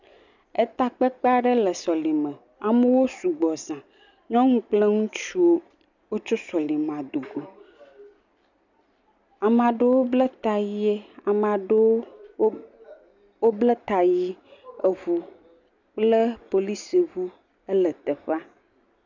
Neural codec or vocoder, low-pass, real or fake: none; 7.2 kHz; real